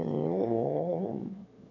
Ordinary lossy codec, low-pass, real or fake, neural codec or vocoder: none; 7.2 kHz; fake; autoencoder, 22.05 kHz, a latent of 192 numbers a frame, VITS, trained on one speaker